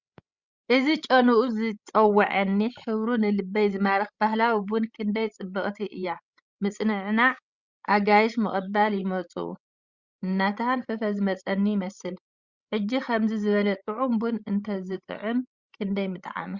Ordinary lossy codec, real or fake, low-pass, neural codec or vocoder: Opus, 64 kbps; fake; 7.2 kHz; codec, 16 kHz, 16 kbps, FreqCodec, larger model